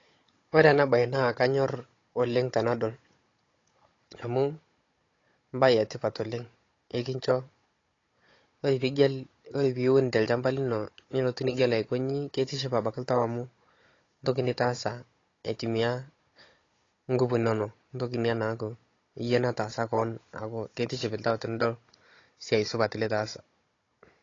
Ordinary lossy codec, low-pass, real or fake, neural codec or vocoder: AAC, 32 kbps; 7.2 kHz; fake; codec, 16 kHz, 16 kbps, FunCodec, trained on Chinese and English, 50 frames a second